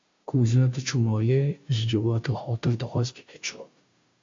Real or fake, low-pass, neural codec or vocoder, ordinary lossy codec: fake; 7.2 kHz; codec, 16 kHz, 0.5 kbps, FunCodec, trained on Chinese and English, 25 frames a second; MP3, 48 kbps